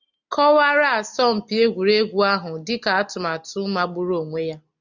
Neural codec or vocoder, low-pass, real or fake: none; 7.2 kHz; real